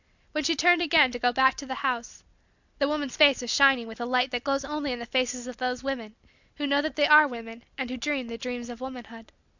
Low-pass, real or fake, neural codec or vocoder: 7.2 kHz; real; none